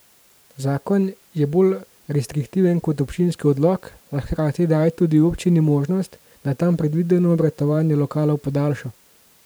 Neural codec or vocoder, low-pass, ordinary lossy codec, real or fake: vocoder, 44.1 kHz, 128 mel bands every 512 samples, BigVGAN v2; none; none; fake